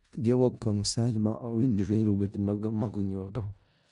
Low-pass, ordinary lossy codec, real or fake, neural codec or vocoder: 10.8 kHz; none; fake; codec, 16 kHz in and 24 kHz out, 0.4 kbps, LongCat-Audio-Codec, four codebook decoder